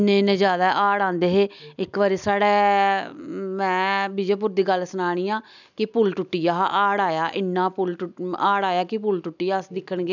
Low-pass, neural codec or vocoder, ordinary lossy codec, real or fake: 7.2 kHz; none; none; real